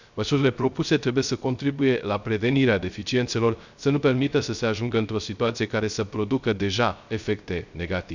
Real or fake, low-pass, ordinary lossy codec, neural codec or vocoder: fake; 7.2 kHz; none; codec, 16 kHz, 0.3 kbps, FocalCodec